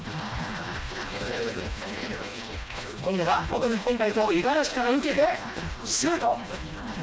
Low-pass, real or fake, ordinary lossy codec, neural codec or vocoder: none; fake; none; codec, 16 kHz, 1 kbps, FreqCodec, smaller model